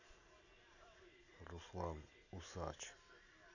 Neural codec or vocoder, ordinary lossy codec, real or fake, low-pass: none; none; real; 7.2 kHz